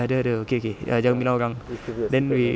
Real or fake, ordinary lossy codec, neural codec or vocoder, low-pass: real; none; none; none